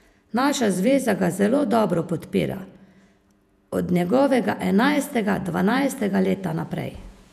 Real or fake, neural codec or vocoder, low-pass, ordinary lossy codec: fake; vocoder, 48 kHz, 128 mel bands, Vocos; 14.4 kHz; none